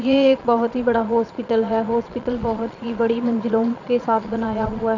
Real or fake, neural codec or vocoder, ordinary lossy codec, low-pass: fake; vocoder, 22.05 kHz, 80 mel bands, Vocos; none; 7.2 kHz